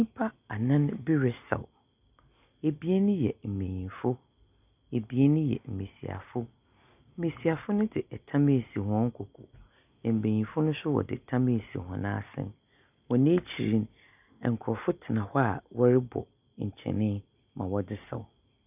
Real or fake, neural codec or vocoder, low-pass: real; none; 3.6 kHz